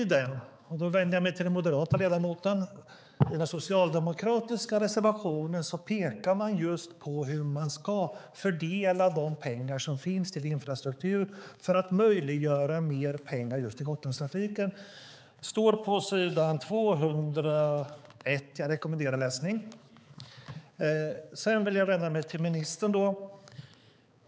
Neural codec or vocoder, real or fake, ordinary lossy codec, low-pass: codec, 16 kHz, 4 kbps, X-Codec, HuBERT features, trained on balanced general audio; fake; none; none